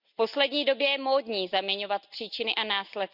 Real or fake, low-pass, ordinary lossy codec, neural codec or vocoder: real; 5.4 kHz; none; none